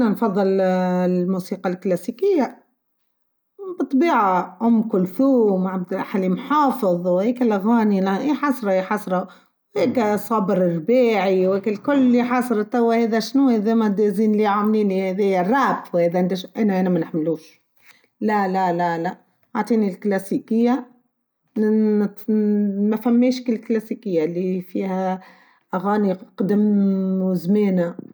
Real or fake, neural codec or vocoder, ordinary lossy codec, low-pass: real; none; none; none